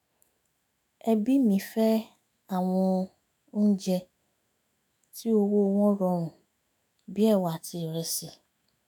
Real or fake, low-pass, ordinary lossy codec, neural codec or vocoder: fake; none; none; autoencoder, 48 kHz, 128 numbers a frame, DAC-VAE, trained on Japanese speech